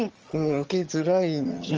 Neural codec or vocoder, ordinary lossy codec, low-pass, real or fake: vocoder, 22.05 kHz, 80 mel bands, HiFi-GAN; Opus, 24 kbps; 7.2 kHz; fake